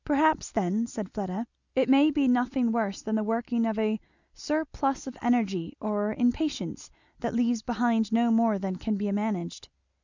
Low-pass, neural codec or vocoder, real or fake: 7.2 kHz; none; real